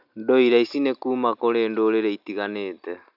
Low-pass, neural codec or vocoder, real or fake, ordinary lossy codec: 5.4 kHz; none; real; none